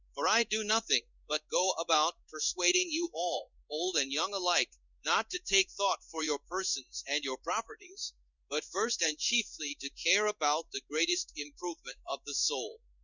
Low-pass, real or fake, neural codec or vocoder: 7.2 kHz; fake; codec, 16 kHz in and 24 kHz out, 1 kbps, XY-Tokenizer